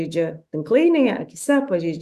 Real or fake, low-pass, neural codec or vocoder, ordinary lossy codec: fake; 14.4 kHz; autoencoder, 48 kHz, 128 numbers a frame, DAC-VAE, trained on Japanese speech; Opus, 64 kbps